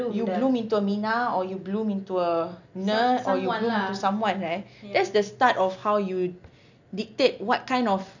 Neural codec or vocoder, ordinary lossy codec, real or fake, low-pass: none; none; real; 7.2 kHz